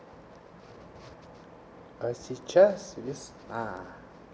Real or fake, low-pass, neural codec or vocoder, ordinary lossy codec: real; none; none; none